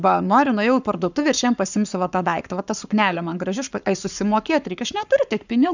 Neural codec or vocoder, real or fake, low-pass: codec, 44.1 kHz, 7.8 kbps, Pupu-Codec; fake; 7.2 kHz